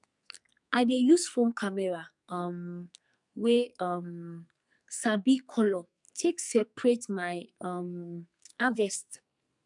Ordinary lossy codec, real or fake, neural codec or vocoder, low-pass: none; fake; codec, 44.1 kHz, 2.6 kbps, SNAC; 10.8 kHz